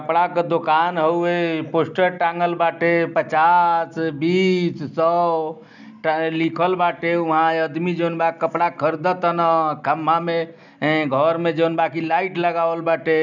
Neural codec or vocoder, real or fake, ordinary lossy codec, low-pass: none; real; none; 7.2 kHz